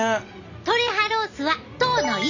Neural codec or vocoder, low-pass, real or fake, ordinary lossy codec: none; 7.2 kHz; real; Opus, 64 kbps